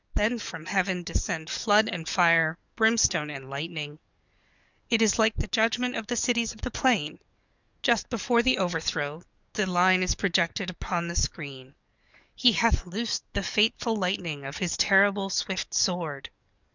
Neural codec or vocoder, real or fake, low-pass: codec, 44.1 kHz, 7.8 kbps, DAC; fake; 7.2 kHz